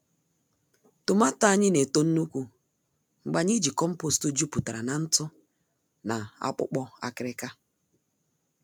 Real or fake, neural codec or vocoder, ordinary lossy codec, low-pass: real; none; none; none